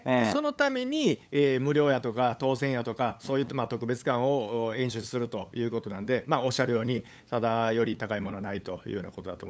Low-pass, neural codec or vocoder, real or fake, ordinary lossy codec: none; codec, 16 kHz, 8 kbps, FunCodec, trained on LibriTTS, 25 frames a second; fake; none